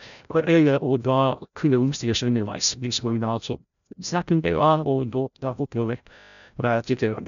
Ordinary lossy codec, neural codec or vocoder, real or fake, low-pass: none; codec, 16 kHz, 0.5 kbps, FreqCodec, larger model; fake; 7.2 kHz